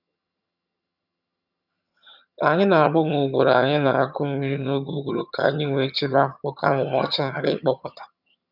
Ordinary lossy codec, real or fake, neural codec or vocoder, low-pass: none; fake; vocoder, 22.05 kHz, 80 mel bands, HiFi-GAN; 5.4 kHz